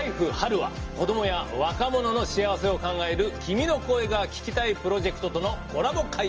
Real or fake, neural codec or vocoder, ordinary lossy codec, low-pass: real; none; Opus, 24 kbps; 7.2 kHz